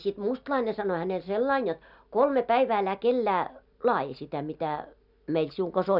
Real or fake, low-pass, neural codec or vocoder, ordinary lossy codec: real; 5.4 kHz; none; none